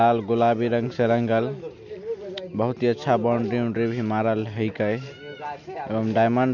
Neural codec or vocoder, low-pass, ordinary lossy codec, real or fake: none; 7.2 kHz; none; real